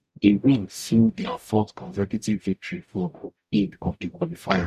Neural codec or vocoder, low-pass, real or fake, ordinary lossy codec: codec, 44.1 kHz, 0.9 kbps, DAC; 14.4 kHz; fake; none